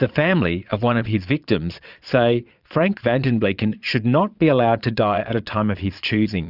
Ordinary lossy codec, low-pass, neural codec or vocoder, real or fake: Opus, 64 kbps; 5.4 kHz; vocoder, 22.05 kHz, 80 mel bands, Vocos; fake